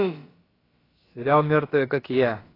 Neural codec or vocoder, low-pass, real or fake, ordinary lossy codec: codec, 16 kHz, about 1 kbps, DyCAST, with the encoder's durations; 5.4 kHz; fake; AAC, 24 kbps